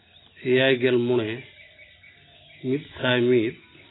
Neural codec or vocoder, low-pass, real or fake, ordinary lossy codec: none; 7.2 kHz; real; AAC, 16 kbps